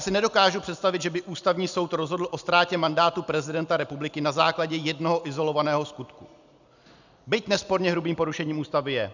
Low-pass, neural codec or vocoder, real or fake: 7.2 kHz; none; real